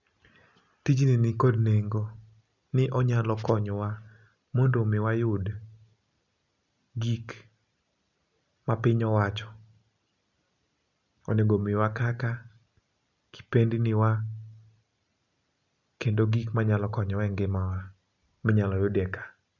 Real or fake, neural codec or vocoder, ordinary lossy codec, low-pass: real; none; none; 7.2 kHz